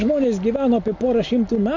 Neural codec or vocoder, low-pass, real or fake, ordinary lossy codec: none; 7.2 kHz; real; MP3, 48 kbps